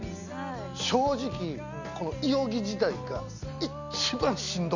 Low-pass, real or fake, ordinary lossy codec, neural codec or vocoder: 7.2 kHz; real; none; none